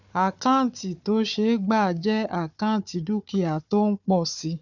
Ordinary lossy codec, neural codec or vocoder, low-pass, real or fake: none; codec, 16 kHz, 4 kbps, FunCodec, trained on Chinese and English, 50 frames a second; 7.2 kHz; fake